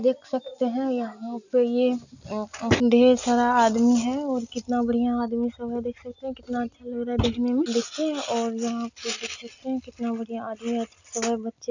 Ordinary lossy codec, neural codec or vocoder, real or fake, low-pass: none; none; real; 7.2 kHz